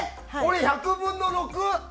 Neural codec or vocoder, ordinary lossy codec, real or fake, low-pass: none; none; real; none